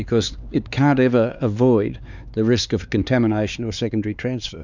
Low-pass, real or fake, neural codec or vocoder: 7.2 kHz; fake; codec, 16 kHz, 4 kbps, X-Codec, HuBERT features, trained on LibriSpeech